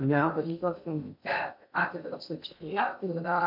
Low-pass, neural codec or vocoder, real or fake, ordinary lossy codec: 5.4 kHz; codec, 16 kHz in and 24 kHz out, 0.6 kbps, FocalCodec, streaming, 2048 codes; fake; MP3, 48 kbps